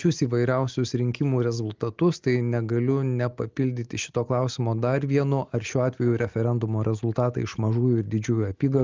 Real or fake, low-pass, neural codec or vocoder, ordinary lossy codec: real; 7.2 kHz; none; Opus, 32 kbps